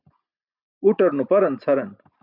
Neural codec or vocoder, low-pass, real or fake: none; 5.4 kHz; real